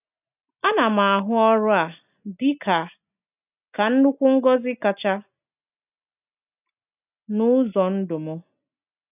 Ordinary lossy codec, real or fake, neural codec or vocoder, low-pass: none; real; none; 3.6 kHz